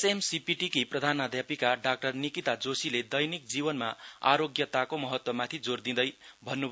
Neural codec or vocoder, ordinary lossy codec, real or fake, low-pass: none; none; real; none